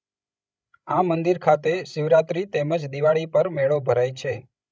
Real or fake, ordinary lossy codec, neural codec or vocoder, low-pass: fake; none; codec, 16 kHz, 16 kbps, FreqCodec, larger model; none